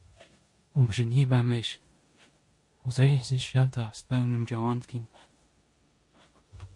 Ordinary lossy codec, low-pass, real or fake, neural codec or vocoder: MP3, 48 kbps; 10.8 kHz; fake; codec, 16 kHz in and 24 kHz out, 0.9 kbps, LongCat-Audio-Codec, four codebook decoder